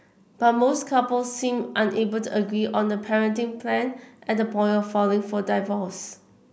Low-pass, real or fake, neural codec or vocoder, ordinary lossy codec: none; real; none; none